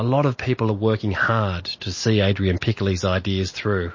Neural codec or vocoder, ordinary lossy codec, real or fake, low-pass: none; MP3, 32 kbps; real; 7.2 kHz